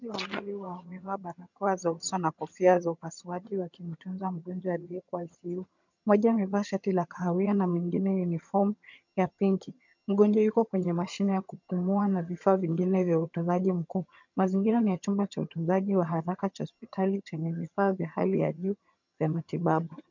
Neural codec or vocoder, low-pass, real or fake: vocoder, 22.05 kHz, 80 mel bands, HiFi-GAN; 7.2 kHz; fake